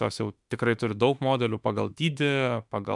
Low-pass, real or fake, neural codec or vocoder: 10.8 kHz; fake; autoencoder, 48 kHz, 32 numbers a frame, DAC-VAE, trained on Japanese speech